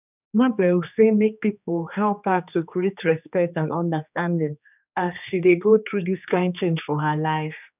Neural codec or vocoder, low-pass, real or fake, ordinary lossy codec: codec, 16 kHz, 2 kbps, X-Codec, HuBERT features, trained on general audio; 3.6 kHz; fake; none